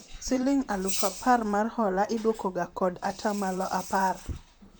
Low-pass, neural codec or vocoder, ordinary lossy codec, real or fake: none; vocoder, 44.1 kHz, 128 mel bands, Pupu-Vocoder; none; fake